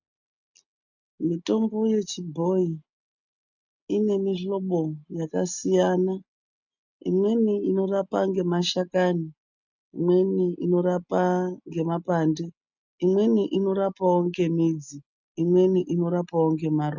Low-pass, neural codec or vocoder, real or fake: 7.2 kHz; none; real